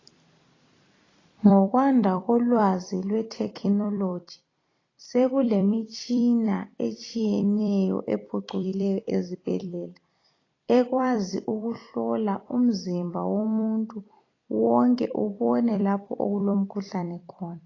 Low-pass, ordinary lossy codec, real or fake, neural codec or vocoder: 7.2 kHz; AAC, 32 kbps; fake; vocoder, 44.1 kHz, 128 mel bands every 256 samples, BigVGAN v2